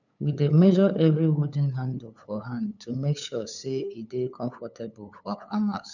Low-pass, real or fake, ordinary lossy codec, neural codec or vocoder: 7.2 kHz; fake; none; codec, 16 kHz, 8 kbps, FunCodec, trained on LibriTTS, 25 frames a second